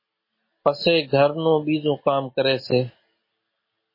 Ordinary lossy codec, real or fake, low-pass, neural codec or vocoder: MP3, 24 kbps; real; 5.4 kHz; none